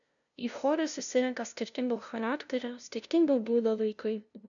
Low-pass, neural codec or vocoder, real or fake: 7.2 kHz; codec, 16 kHz, 0.5 kbps, FunCodec, trained on LibriTTS, 25 frames a second; fake